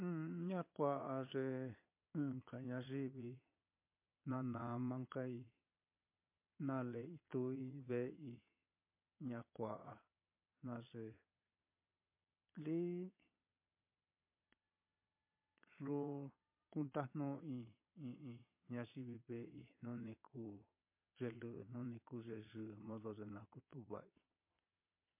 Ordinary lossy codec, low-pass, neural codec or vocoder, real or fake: MP3, 24 kbps; 3.6 kHz; vocoder, 22.05 kHz, 80 mel bands, Vocos; fake